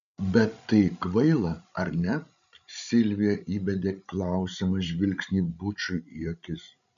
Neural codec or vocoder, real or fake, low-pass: codec, 16 kHz, 16 kbps, FreqCodec, larger model; fake; 7.2 kHz